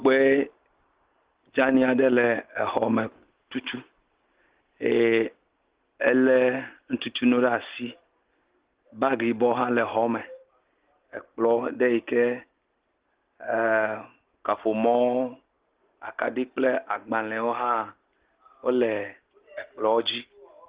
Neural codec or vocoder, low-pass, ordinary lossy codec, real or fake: none; 3.6 kHz; Opus, 16 kbps; real